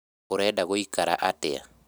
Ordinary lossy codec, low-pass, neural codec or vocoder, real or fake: none; none; none; real